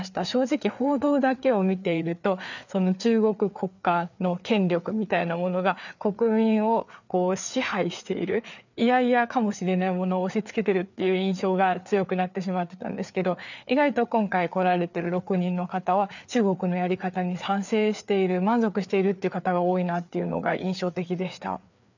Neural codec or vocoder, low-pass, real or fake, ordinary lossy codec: codec, 16 kHz, 4 kbps, FreqCodec, larger model; 7.2 kHz; fake; none